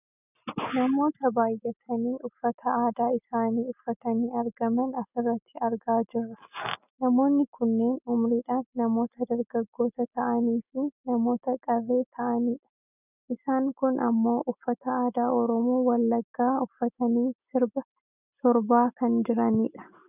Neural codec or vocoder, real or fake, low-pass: none; real; 3.6 kHz